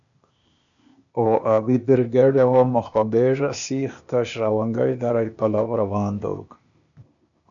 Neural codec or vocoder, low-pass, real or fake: codec, 16 kHz, 0.8 kbps, ZipCodec; 7.2 kHz; fake